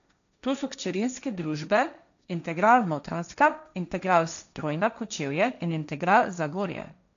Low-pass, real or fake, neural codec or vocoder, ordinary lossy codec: 7.2 kHz; fake; codec, 16 kHz, 1.1 kbps, Voila-Tokenizer; none